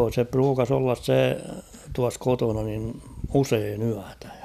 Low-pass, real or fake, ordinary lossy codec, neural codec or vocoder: 14.4 kHz; real; none; none